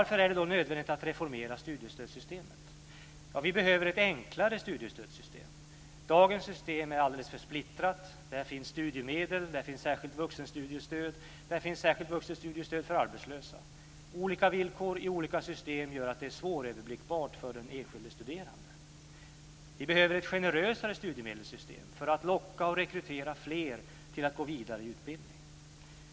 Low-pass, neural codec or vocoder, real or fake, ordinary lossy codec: none; none; real; none